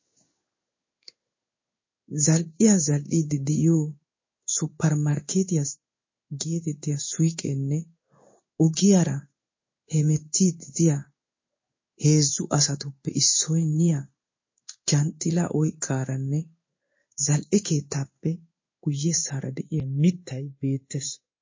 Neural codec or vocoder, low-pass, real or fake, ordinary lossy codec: codec, 16 kHz in and 24 kHz out, 1 kbps, XY-Tokenizer; 7.2 kHz; fake; MP3, 32 kbps